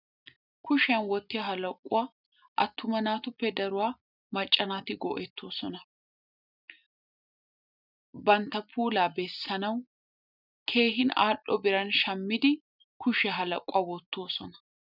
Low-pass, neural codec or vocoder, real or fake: 5.4 kHz; none; real